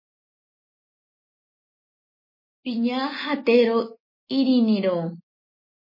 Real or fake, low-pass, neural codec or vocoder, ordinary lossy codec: real; 5.4 kHz; none; MP3, 24 kbps